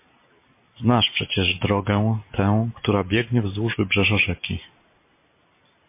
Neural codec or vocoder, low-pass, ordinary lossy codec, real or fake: none; 3.6 kHz; MP3, 24 kbps; real